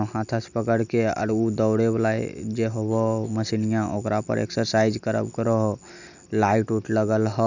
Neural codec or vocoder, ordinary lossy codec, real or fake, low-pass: none; none; real; 7.2 kHz